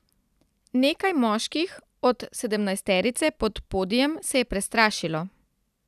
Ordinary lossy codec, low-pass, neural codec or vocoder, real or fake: none; 14.4 kHz; none; real